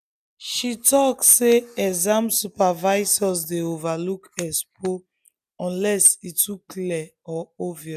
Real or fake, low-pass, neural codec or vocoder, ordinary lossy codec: real; 14.4 kHz; none; none